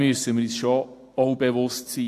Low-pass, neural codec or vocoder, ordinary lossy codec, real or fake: 14.4 kHz; none; AAC, 64 kbps; real